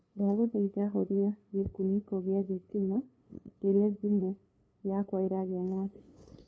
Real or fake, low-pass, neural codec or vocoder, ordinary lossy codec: fake; none; codec, 16 kHz, 2 kbps, FunCodec, trained on LibriTTS, 25 frames a second; none